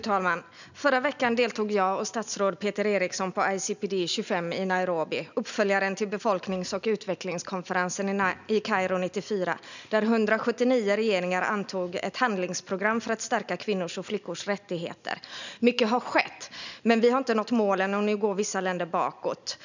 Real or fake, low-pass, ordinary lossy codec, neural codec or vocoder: real; 7.2 kHz; none; none